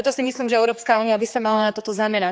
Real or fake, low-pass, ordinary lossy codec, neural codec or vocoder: fake; none; none; codec, 16 kHz, 2 kbps, X-Codec, HuBERT features, trained on general audio